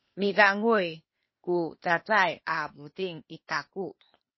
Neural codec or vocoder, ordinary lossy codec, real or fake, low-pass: codec, 16 kHz, 0.8 kbps, ZipCodec; MP3, 24 kbps; fake; 7.2 kHz